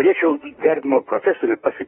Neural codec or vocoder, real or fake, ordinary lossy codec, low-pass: autoencoder, 48 kHz, 32 numbers a frame, DAC-VAE, trained on Japanese speech; fake; AAC, 16 kbps; 19.8 kHz